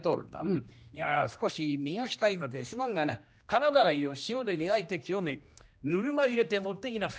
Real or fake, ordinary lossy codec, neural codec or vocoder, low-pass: fake; none; codec, 16 kHz, 1 kbps, X-Codec, HuBERT features, trained on general audio; none